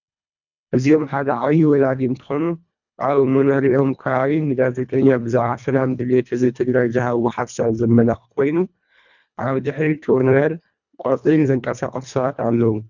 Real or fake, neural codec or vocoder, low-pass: fake; codec, 24 kHz, 1.5 kbps, HILCodec; 7.2 kHz